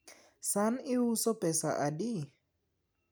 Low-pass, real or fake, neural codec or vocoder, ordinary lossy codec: none; real; none; none